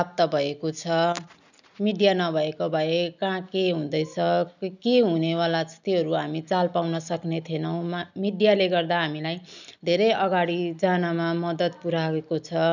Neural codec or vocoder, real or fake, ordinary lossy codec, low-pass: none; real; none; 7.2 kHz